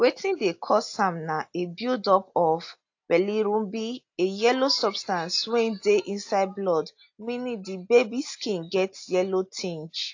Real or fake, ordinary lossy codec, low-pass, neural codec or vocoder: real; AAC, 48 kbps; 7.2 kHz; none